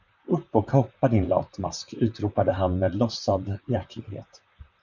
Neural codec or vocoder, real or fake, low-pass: vocoder, 44.1 kHz, 128 mel bands, Pupu-Vocoder; fake; 7.2 kHz